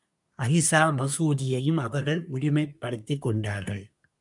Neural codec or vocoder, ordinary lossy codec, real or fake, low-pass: codec, 24 kHz, 1 kbps, SNAC; MP3, 96 kbps; fake; 10.8 kHz